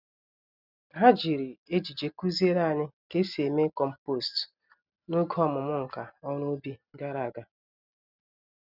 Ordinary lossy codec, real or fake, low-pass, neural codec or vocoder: none; real; 5.4 kHz; none